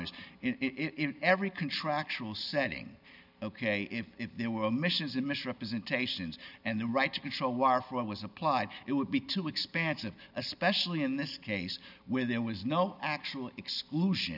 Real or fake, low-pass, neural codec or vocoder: real; 5.4 kHz; none